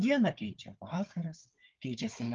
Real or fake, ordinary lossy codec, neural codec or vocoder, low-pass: fake; Opus, 24 kbps; codec, 16 kHz, 4 kbps, FunCodec, trained on Chinese and English, 50 frames a second; 7.2 kHz